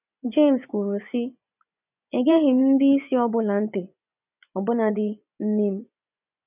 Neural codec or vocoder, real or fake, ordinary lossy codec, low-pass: vocoder, 24 kHz, 100 mel bands, Vocos; fake; none; 3.6 kHz